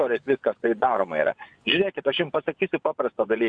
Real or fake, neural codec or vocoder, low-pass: fake; vocoder, 24 kHz, 100 mel bands, Vocos; 9.9 kHz